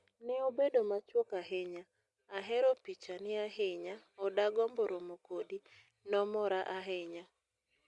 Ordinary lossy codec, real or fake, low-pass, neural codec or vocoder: Opus, 64 kbps; real; 10.8 kHz; none